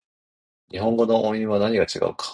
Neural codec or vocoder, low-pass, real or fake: none; 9.9 kHz; real